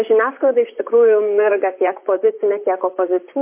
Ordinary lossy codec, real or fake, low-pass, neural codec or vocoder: MP3, 24 kbps; fake; 3.6 kHz; vocoder, 44.1 kHz, 128 mel bands, Pupu-Vocoder